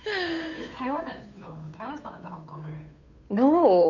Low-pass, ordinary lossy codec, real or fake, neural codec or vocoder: 7.2 kHz; none; fake; codec, 16 kHz, 2 kbps, FunCodec, trained on Chinese and English, 25 frames a second